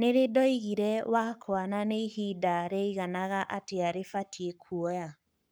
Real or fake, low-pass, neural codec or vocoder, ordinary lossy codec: fake; none; codec, 44.1 kHz, 7.8 kbps, Pupu-Codec; none